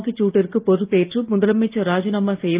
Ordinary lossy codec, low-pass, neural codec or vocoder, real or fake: Opus, 32 kbps; 3.6 kHz; none; real